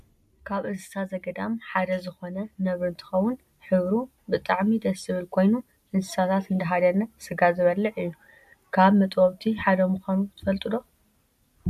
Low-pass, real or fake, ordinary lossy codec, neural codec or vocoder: 14.4 kHz; real; MP3, 96 kbps; none